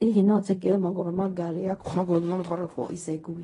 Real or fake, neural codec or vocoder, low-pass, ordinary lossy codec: fake; codec, 16 kHz in and 24 kHz out, 0.4 kbps, LongCat-Audio-Codec, fine tuned four codebook decoder; 10.8 kHz; AAC, 32 kbps